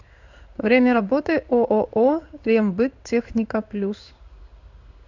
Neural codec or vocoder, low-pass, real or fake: codec, 16 kHz in and 24 kHz out, 1 kbps, XY-Tokenizer; 7.2 kHz; fake